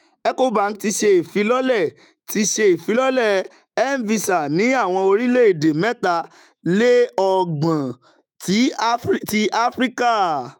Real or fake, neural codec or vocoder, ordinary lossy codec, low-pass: fake; autoencoder, 48 kHz, 128 numbers a frame, DAC-VAE, trained on Japanese speech; none; 19.8 kHz